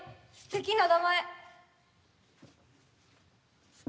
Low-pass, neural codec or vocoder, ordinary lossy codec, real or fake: none; none; none; real